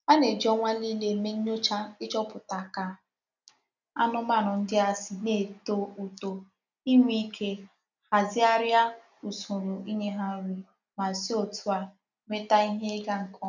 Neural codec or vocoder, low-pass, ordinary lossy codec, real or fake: none; 7.2 kHz; none; real